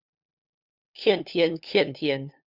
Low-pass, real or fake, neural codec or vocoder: 5.4 kHz; fake; codec, 16 kHz, 2 kbps, FunCodec, trained on LibriTTS, 25 frames a second